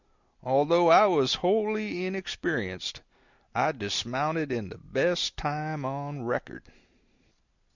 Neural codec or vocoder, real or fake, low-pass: none; real; 7.2 kHz